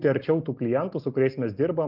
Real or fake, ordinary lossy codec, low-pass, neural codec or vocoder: real; Opus, 32 kbps; 5.4 kHz; none